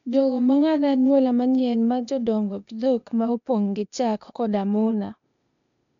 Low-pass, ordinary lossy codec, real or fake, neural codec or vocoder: 7.2 kHz; none; fake; codec, 16 kHz, 0.8 kbps, ZipCodec